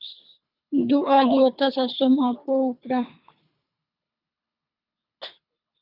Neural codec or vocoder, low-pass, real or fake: codec, 24 kHz, 3 kbps, HILCodec; 5.4 kHz; fake